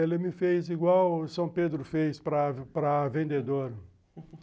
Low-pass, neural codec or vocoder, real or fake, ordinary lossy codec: none; none; real; none